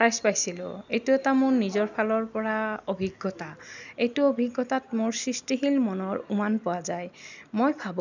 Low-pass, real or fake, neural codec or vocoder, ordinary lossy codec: 7.2 kHz; real; none; none